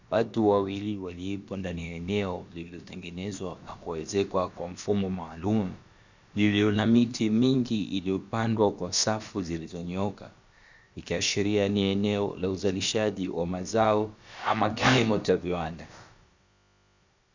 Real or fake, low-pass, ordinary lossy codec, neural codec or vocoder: fake; 7.2 kHz; Opus, 64 kbps; codec, 16 kHz, about 1 kbps, DyCAST, with the encoder's durations